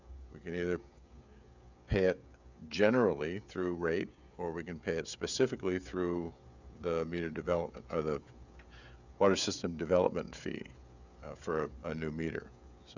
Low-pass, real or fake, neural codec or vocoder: 7.2 kHz; fake; codec, 16 kHz, 16 kbps, FreqCodec, smaller model